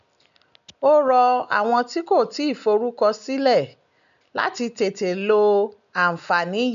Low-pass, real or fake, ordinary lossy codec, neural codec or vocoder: 7.2 kHz; real; none; none